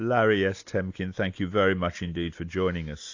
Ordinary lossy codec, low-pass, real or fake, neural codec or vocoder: AAC, 48 kbps; 7.2 kHz; real; none